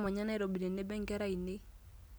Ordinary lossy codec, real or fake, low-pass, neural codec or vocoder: none; real; none; none